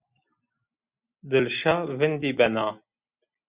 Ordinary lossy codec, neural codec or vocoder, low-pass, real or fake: Opus, 64 kbps; none; 3.6 kHz; real